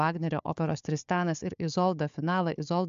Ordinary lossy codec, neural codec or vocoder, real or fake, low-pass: MP3, 64 kbps; codec, 16 kHz, 4 kbps, FunCodec, trained on Chinese and English, 50 frames a second; fake; 7.2 kHz